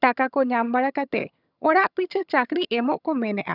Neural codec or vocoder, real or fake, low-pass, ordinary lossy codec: vocoder, 22.05 kHz, 80 mel bands, HiFi-GAN; fake; 5.4 kHz; none